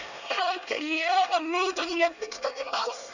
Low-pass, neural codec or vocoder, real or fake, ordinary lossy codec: 7.2 kHz; codec, 24 kHz, 1 kbps, SNAC; fake; none